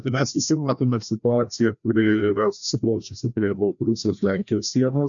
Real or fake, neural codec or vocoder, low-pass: fake; codec, 16 kHz, 1 kbps, FreqCodec, larger model; 7.2 kHz